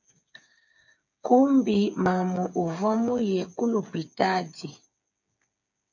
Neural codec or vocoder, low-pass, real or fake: codec, 16 kHz, 8 kbps, FreqCodec, smaller model; 7.2 kHz; fake